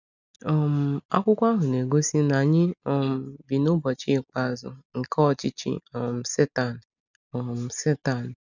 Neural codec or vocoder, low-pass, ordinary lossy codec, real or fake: none; 7.2 kHz; none; real